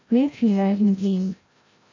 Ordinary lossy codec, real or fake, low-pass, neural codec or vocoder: MP3, 48 kbps; fake; 7.2 kHz; codec, 16 kHz, 0.5 kbps, FreqCodec, larger model